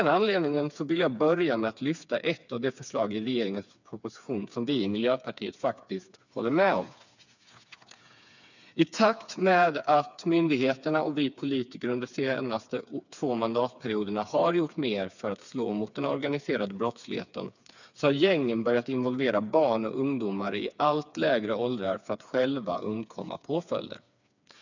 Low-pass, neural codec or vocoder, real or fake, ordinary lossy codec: 7.2 kHz; codec, 16 kHz, 4 kbps, FreqCodec, smaller model; fake; none